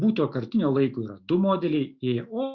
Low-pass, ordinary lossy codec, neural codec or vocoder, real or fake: 7.2 kHz; Opus, 64 kbps; autoencoder, 48 kHz, 128 numbers a frame, DAC-VAE, trained on Japanese speech; fake